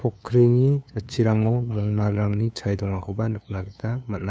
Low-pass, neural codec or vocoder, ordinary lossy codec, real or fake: none; codec, 16 kHz, 2 kbps, FunCodec, trained on LibriTTS, 25 frames a second; none; fake